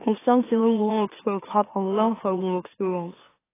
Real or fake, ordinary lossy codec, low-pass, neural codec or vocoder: fake; AAC, 16 kbps; 3.6 kHz; autoencoder, 44.1 kHz, a latent of 192 numbers a frame, MeloTTS